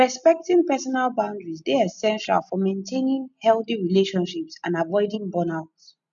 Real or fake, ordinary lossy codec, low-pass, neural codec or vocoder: real; none; 7.2 kHz; none